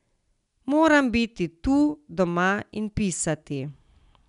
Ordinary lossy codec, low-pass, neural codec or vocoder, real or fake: none; 10.8 kHz; none; real